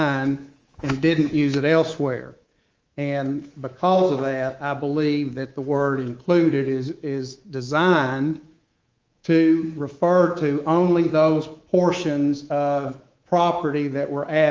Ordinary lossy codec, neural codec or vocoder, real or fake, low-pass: Opus, 32 kbps; codec, 24 kHz, 3.1 kbps, DualCodec; fake; 7.2 kHz